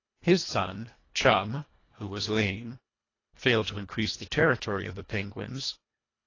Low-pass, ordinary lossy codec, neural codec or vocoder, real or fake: 7.2 kHz; AAC, 32 kbps; codec, 24 kHz, 1.5 kbps, HILCodec; fake